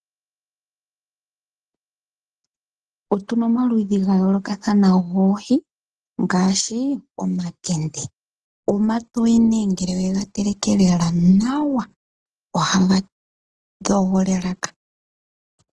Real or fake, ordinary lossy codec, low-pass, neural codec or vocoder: real; Opus, 16 kbps; 10.8 kHz; none